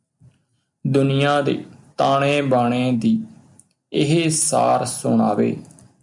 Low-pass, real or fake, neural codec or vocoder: 10.8 kHz; real; none